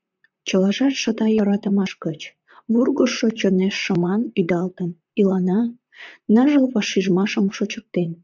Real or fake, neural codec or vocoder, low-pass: fake; vocoder, 44.1 kHz, 128 mel bands, Pupu-Vocoder; 7.2 kHz